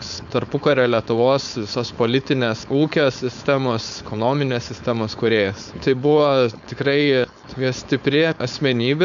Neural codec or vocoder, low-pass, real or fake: codec, 16 kHz, 4.8 kbps, FACodec; 7.2 kHz; fake